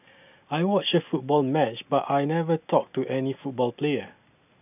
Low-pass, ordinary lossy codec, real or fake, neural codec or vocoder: 3.6 kHz; none; real; none